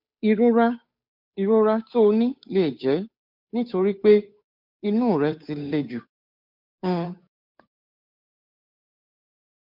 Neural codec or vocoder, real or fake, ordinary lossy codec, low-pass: codec, 16 kHz, 8 kbps, FunCodec, trained on Chinese and English, 25 frames a second; fake; MP3, 48 kbps; 5.4 kHz